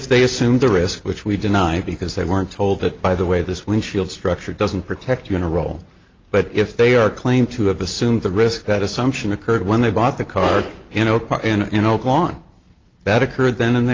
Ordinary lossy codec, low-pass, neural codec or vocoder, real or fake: Opus, 32 kbps; 7.2 kHz; none; real